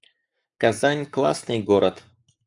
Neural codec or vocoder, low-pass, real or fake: codec, 44.1 kHz, 7.8 kbps, Pupu-Codec; 10.8 kHz; fake